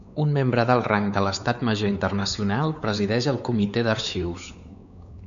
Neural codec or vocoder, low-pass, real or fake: codec, 16 kHz, 4 kbps, X-Codec, WavLM features, trained on Multilingual LibriSpeech; 7.2 kHz; fake